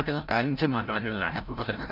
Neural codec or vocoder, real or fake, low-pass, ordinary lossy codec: codec, 16 kHz, 0.5 kbps, FreqCodec, larger model; fake; 5.4 kHz; none